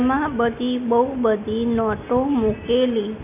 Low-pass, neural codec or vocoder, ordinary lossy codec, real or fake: 3.6 kHz; none; none; real